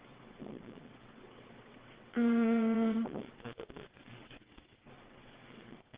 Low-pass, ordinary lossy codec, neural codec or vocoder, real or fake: 3.6 kHz; Opus, 16 kbps; vocoder, 22.05 kHz, 80 mel bands, WaveNeXt; fake